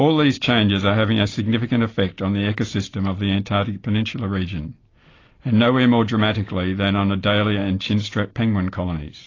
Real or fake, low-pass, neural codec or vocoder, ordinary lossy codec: real; 7.2 kHz; none; AAC, 32 kbps